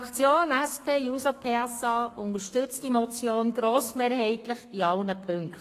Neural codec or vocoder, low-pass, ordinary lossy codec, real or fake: codec, 32 kHz, 1.9 kbps, SNAC; 14.4 kHz; AAC, 48 kbps; fake